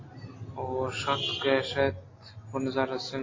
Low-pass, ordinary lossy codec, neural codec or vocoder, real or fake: 7.2 kHz; AAC, 32 kbps; none; real